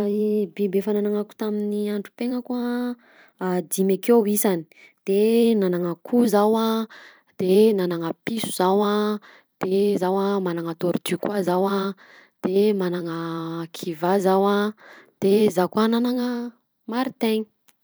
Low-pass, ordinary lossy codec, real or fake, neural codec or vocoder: none; none; fake; vocoder, 44.1 kHz, 128 mel bands every 512 samples, BigVGAN v2